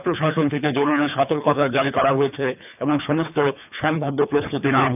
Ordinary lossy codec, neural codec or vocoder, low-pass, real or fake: none; codec, 24 kHz, 3 kbps, HILCodec; 3.6 kHz; fake